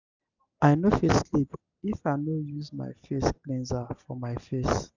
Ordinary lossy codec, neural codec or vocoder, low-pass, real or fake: none; none; 7.2 kHz; real